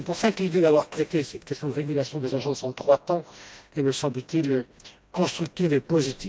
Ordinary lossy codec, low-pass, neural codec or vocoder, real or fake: none; none; codec, 16 kHz, 1 kbps, FreqCodec, smaller model; fake